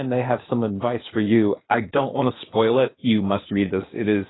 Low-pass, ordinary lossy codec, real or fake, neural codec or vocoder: 7.2 kHz; AAC, 16 kbps; fake; codec, 16 kHz, 0.8 kbps, ZipCodec